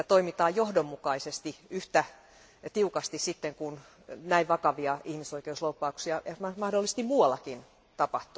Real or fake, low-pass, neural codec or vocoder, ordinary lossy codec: real; none; none; none